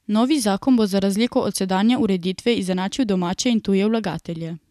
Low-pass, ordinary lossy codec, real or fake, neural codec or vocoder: 14.4 kHz; none; real; none